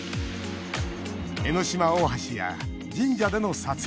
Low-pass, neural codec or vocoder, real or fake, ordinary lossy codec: none; none; real; none